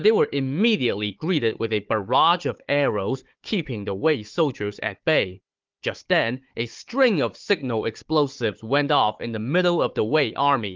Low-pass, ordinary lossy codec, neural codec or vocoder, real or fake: 7.2 kHz; Opus, 32 kbps; codec, 16 kHz, 4 kbps, FunCodec, trained on Chinese and English, 50 frames a second; fake